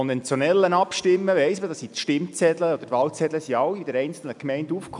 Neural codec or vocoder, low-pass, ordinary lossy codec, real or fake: none; 10.8 kHz; none; real